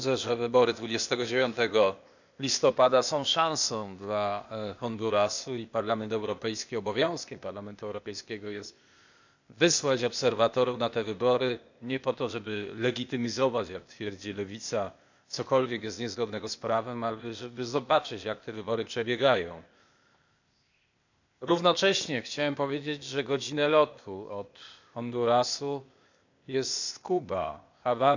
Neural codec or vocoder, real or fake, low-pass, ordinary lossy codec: codec, 16 kHz, 0.8 kbps, ZipCodec; fake; 7.2 kHz; none